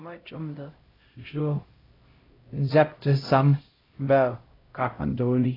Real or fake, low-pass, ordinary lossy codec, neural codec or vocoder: fake; 5.4 kHz; AAC, 24 kbps; codec, 16 kHz, 0.5 kbps, X-Codec, HuBERT features, trained on LibriSpeech